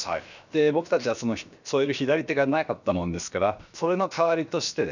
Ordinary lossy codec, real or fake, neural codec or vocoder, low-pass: none; fake; codec, 16 kHz, about 1 kbps, DyCAST, with the encoder's durations; 7.2 kHz